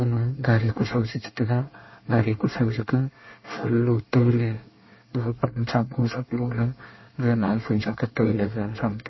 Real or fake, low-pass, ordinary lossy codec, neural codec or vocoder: fake; 7.2 kHz; MP3, 24 kbps; codec, 24 kHz, 1 kbps, SNAC